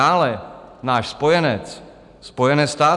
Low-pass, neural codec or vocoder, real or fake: 10.8 kHz; none; real